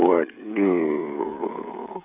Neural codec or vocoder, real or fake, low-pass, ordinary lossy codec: codec, 16 kHz, 16 kbps, FreqCodec, smaller model; fake; 3.6 kHz; none